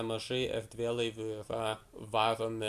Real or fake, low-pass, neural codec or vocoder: real; 14.4 kHz; none